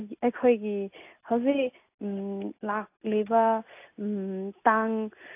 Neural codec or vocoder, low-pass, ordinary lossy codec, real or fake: none; 3.6 kHz; AAC, 24 kbps; real